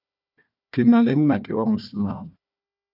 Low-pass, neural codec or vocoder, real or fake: 5.4 kHz; codec, 16 kHz, 1 kbps, FunCodec, trained on Chinese and English, 50 frames a second; fake